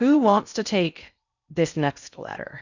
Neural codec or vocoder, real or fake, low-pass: codec, 16 kHz in and 24 kHz out, 0.6 kbps, FocalCodec, streaming, 4096 codes; fake; 7.2 kHz